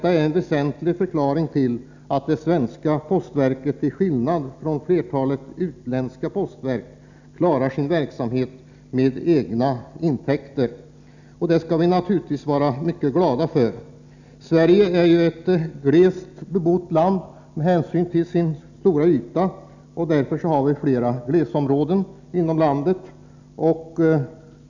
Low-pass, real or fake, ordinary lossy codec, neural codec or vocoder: 7.2 kHz; real; none; none